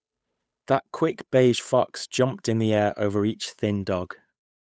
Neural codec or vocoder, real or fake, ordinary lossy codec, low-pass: codec, 16 kHz, 8 kbps, FunCodec, trained on Chinese and English, 25 frames a second; fake; none; none